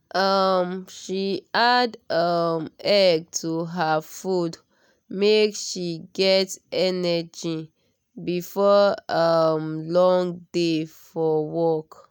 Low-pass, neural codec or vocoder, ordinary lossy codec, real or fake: 19.8 kHz; none; none; real